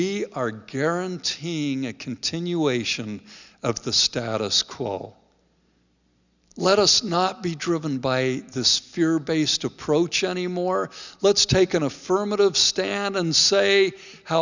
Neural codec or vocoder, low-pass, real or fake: none; 7.2 kHz; real